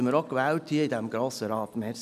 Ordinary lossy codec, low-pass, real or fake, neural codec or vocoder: none; 14.4 kHz; real; none